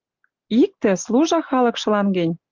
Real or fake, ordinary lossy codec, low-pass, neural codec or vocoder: real; Opus, 32 kbps; 7.2 kHz; none